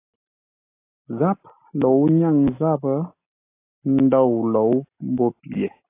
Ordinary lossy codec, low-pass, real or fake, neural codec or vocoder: AAC, 24 kbps; 3.6 kHz; real; none